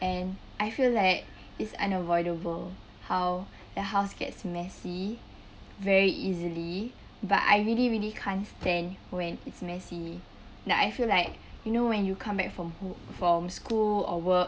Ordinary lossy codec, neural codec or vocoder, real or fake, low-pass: none; none; real; none